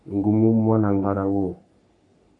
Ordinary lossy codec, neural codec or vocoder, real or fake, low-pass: AAC, 64 kbps; codec, 44.1 kHz, 3.4 kbps, Pupu-Codec; fake; 10.8 kHz